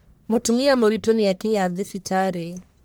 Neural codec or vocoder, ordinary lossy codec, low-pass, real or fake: codec, 44.1 kHz, 1.7 kbps, Pupu-Codec; none; none; fake